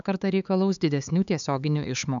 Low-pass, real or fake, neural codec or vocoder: 7.2 kHz; fake; codec, 16 kHz, 4 kbps, X-Codec, HuBERT features, trained on LibriSpeech